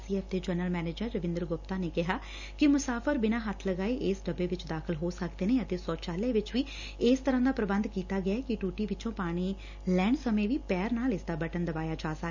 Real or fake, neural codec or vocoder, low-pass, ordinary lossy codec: real; none; 7.2 kHz; none